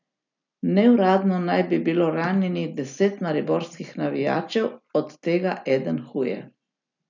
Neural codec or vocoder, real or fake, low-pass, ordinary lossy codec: none; real; 7.2 kHz; none